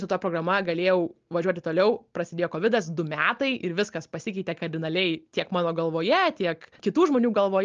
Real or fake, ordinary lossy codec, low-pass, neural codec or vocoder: real; Opus, 24 kbps; 7.2 kHz; none